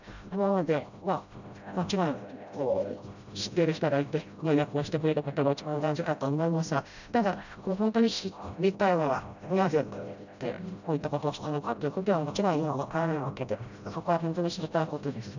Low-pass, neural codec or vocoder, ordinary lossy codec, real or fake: 7.2 kHz; codec, 16 kHz, 0.5 kbps, FreqCodec, smaller model; none; fake